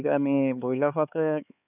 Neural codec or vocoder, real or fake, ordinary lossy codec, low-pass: codec, 16 kHz, 4 kbps, X-Codec, HuBERT features, trained on LibriSpeech; fake; none; 3.6 kHz